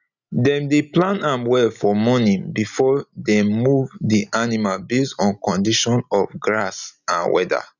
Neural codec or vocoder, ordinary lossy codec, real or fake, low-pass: none; none; real; 7.2 kHz